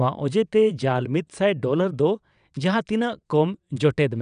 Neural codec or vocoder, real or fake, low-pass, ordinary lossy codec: vocoder, 22.05 kHz, 80 mel bands, WaveNeXt; fake; 9.9 kHz; none